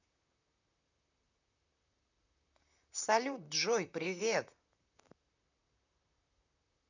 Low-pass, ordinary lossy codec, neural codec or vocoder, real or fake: 7.2 kHz; none; vocoder, 44.1 kHz, 128 mel bands, Pupu-Vocoder; fake